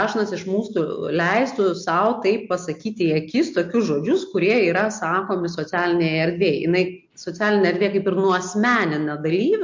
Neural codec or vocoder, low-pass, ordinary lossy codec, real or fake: none; 7.2 kHz; MP3, 48 kbps; real